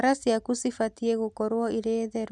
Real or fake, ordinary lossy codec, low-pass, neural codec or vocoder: real; none; none; none